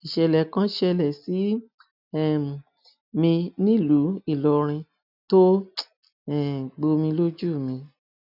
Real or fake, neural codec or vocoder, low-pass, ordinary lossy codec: real; none; 5.4 kHz; none